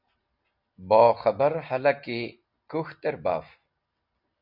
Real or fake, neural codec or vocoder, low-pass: real; none; 5.4 kHz